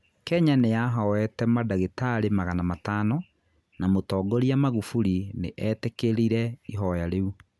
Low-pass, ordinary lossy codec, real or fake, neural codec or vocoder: none; none; real; none